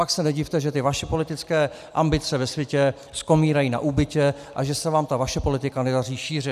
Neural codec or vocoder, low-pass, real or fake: none; 14.4 kHz; real